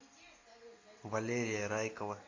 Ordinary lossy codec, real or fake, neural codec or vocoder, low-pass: AAC, 32 kbps; real; none; 7.2 kHz